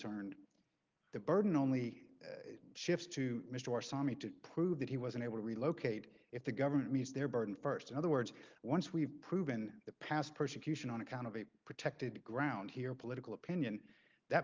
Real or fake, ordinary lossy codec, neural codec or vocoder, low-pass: real; Opus, 32 kbps; none; 7.2 kHz